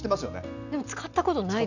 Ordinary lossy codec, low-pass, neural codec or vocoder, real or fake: none; 7.2 kHz; none; real